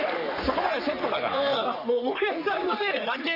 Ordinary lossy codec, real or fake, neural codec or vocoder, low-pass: none; fake; codec, 44.1 kHz, 3.4 kbps, Pupu-Codec; 5.4 kHz